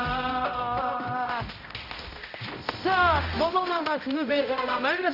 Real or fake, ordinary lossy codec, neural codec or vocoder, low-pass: fake; none; codec, 16 kHz, 0.5 kbps, X-Codec, HuBERT features, trained on balanced general audio; 5.4 kHz